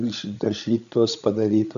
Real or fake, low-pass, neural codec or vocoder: fake; 7.2 kHz; codec, 16 kHz, 16 kbps, FreqCodec, larger model